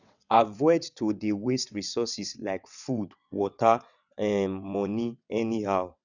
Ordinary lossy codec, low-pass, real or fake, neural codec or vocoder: none; 7.2 kHz; fake; vocoder, 22.05 kHz, 80 mel bands, WaveNeXt